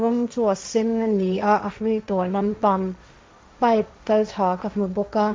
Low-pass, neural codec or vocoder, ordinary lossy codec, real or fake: none; codec, 16 kHz, 1.1 kbps, Voila-Tokenizer; none; fake